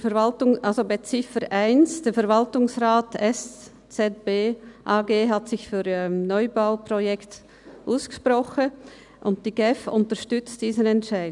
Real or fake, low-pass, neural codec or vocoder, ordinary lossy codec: real; 10.8 kHz; none; none